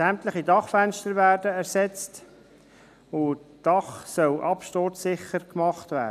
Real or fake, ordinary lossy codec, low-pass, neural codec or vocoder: real; none; 14.4 kHz; none